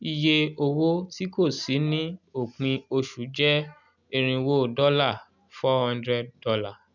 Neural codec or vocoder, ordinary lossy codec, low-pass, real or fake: none; none; 7.2 kHz; real